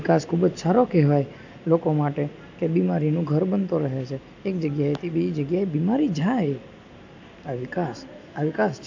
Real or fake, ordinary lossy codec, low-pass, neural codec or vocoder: real; AAC, 48 kbps; 7.2 kHz; none